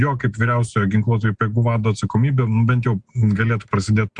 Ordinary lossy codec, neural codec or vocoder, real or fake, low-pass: Opus, 64 kbps; none; real; 9.9 kHz